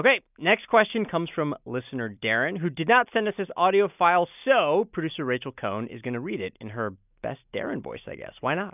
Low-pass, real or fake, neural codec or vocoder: 3.6 kHz; real; none